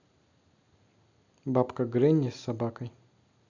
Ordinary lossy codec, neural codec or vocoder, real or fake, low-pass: none; none; real; 7.2 kHz